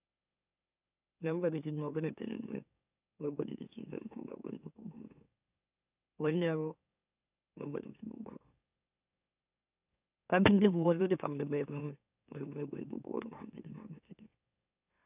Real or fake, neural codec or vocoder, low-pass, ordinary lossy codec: fake; autoencoder, 44.1 kHz, a latent of 192 numbers a frame, MeloTTS; 3.6 kHz; none